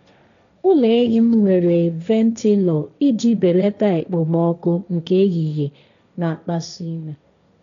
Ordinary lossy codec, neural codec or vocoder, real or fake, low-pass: none; codec, 16 kHz, 1.1 kbps, Voila-Tokenizer; fake; 7.2 kHz